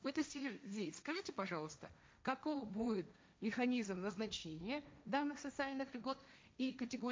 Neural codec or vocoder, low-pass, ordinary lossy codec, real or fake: codec, 16 kHz, 1.1 kbps, Voila-Tokenizer; none; none; fake